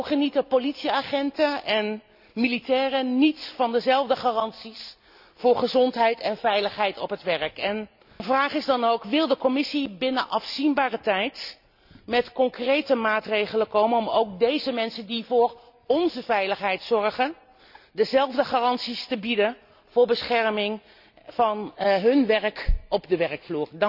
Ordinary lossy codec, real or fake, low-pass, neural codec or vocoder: none; real; 5.4 kHz; none